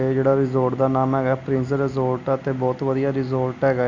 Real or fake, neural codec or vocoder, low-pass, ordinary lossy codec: real; none; 7.2 kHz; none